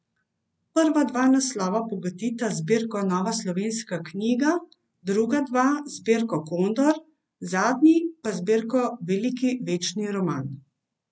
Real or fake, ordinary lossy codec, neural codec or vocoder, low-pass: real; none; none; none